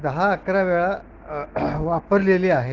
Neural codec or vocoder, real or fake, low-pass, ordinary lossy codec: none; real; 7.2 kHz; Opus, 16 kbps